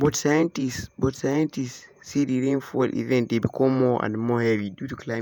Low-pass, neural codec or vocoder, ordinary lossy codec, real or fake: none; vocoder, 48 kHz, 128 mel bands, Vocos; none; fake